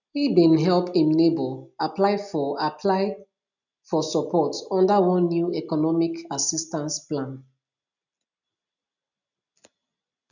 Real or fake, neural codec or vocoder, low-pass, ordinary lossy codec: real; none; 7.2 kHz; none